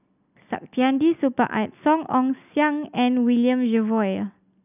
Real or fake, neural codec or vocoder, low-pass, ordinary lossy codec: real; none; 3.6 kHz; none